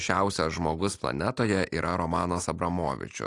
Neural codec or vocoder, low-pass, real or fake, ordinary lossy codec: none; 10.8 kHz; real; AAC, 48 kbps